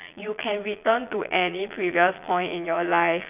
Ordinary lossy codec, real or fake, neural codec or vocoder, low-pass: none; fake; vocoder, 22.05 kHz, 80 mel bands, Vocos; 3.6 kHz